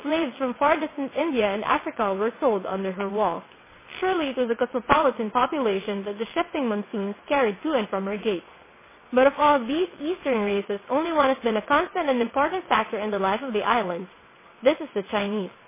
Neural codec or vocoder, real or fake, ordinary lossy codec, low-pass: vocoder, 22.05 kHz, 80 mel bands, WaveNeXt; fake; MP3, 16 kbps; 3.6 kHz